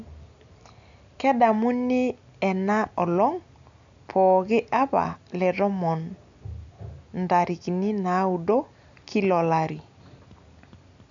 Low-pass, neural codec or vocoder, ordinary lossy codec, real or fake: 7.2 kHz; none; AAC, 64 kbps; real